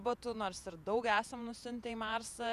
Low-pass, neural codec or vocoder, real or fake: 14.4 kHz; vocoder, 48 kHz, 128 mel bands, Vocos; fake